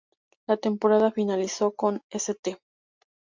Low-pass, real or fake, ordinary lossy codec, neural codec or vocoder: 7.2 kHz; real; MP3, 64 kbps; none